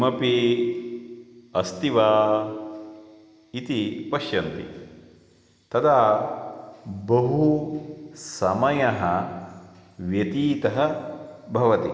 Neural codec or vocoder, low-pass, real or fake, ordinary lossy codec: none; none; real; none